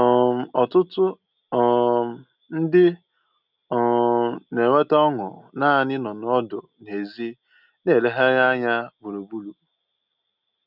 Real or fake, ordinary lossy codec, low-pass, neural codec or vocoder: real; none; 5.4 kHz; none